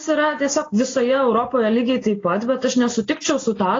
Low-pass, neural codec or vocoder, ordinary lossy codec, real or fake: 7.2 kHz; none; AAC, 32 kbps; real